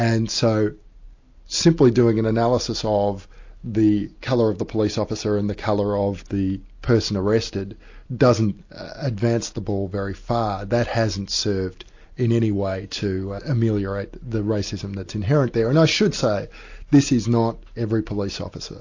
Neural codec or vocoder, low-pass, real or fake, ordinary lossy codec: none; 7.2 kHz; real; AAC, 48 kbps